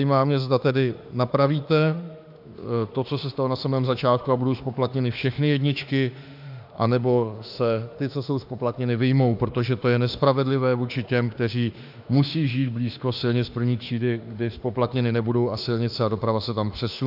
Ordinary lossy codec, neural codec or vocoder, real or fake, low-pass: AAC, 48 kbps; autoencoder, 48 kHz, 32 numbers a frame, DAC-VAE, trained on Japanese speech; fake; 5.4 kHz